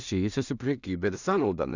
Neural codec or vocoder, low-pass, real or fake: codec, 16 kHz in and 24 kHz out, 0.4 kbps, LongCat-Audio-Codec, two codebook decoder; 7.2 kHz; fake